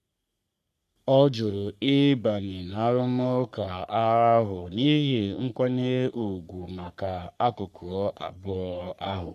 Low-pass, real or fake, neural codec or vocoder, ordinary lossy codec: 14.4 kHz; fake; codec, 44.1 kHz, 3.4 kbps, Pupu-Codec; none